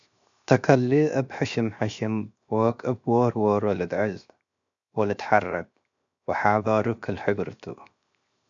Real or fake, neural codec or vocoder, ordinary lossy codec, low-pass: fake; codec, 16 kHz, 0.7 kbps, FocalCodec; AAC, 64 kbps; 7.2 kHz